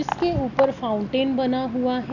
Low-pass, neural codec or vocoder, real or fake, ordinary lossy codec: 7.2 kHz; none; real; none